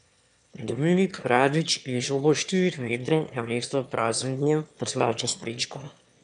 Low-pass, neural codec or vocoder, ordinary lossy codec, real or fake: 9.9 kHz; autoencoder, 22.05 kHz, a latent of 192 numbers a frame, VITS, trained on one speaker; none; fake